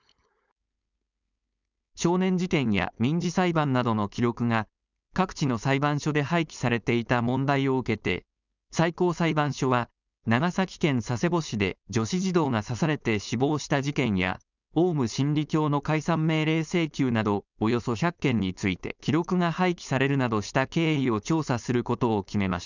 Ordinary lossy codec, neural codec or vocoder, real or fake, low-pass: none; codec, 16 kHz, 4.8 kbps, FACodec; fake; 7.2 kHz